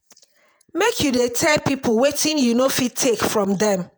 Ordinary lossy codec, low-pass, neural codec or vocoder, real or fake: none; none; vocoder, 48 kHz, 128 mel bands, Vocos; fake